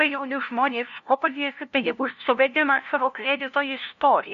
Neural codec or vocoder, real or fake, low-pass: codec, 16 kHz, 0.5 kbps, FunCodec, trained on LibriTTS, 25 frames a second; fake; 7.2 kHz